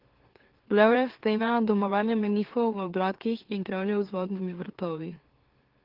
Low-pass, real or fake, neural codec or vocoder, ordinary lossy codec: 5.4 kHz; fake; autoencoder, 44.1 kHz, a latent of 192 numbers a frame, MeloTTS; Opus, 16 kbps